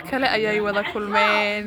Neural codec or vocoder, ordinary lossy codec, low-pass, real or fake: none; none; none; real